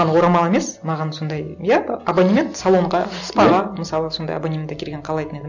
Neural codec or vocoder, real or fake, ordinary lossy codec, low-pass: none; real; none; 7.2 kHz